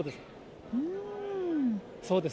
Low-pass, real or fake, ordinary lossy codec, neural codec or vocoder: none; real; none; none